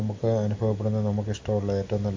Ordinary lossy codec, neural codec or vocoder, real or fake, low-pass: AAC, 48 kbps; none; real; 7.2 kHz